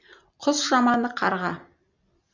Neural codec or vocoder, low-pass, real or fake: none; 7.2 kHz; real